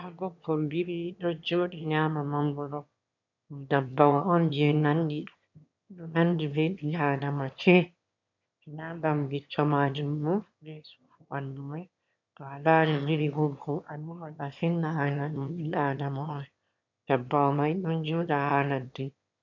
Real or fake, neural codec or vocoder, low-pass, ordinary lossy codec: fake; autoencoder, 22.05 kHz, a latent of 192 numbers a frame, VITS, trained on one speaker; 7.2 kHz; MP3, 64 kbps